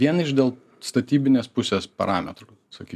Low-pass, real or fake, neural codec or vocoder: 14.4 kHz; real; none